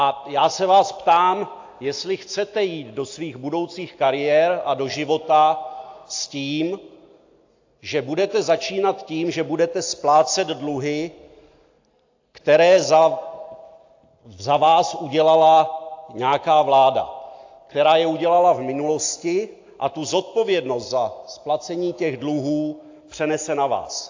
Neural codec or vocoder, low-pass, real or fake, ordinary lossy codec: none; 7.2 kHz; real; AAC, 48 kbps